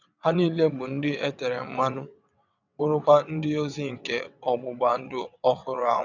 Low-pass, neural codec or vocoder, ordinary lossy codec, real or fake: 7.2 kHz; vocoder, 22.05 kHz, 80 mel bands, WaveNeXt; none; fake